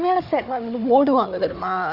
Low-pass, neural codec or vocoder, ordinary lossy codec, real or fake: 5.4 kHz; codec, 16 kHz, 4 kbps, FunCodec, trained on Chinese and English, 50 frames a second; Opus, 64 kbps; fake